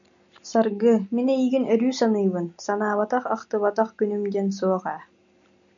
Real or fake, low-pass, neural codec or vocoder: real; 7.2 kHz; none